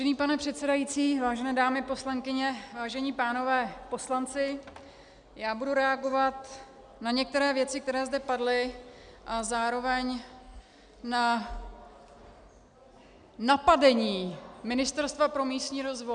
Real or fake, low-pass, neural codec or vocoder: real; 9.9 kHz; none